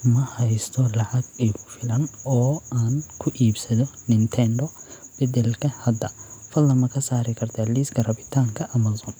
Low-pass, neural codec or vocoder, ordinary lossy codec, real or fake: none; none; none; real